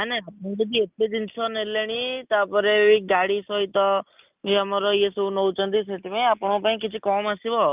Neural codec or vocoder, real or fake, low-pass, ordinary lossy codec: none; real; 3.6 kHz; Opus, 24 kbps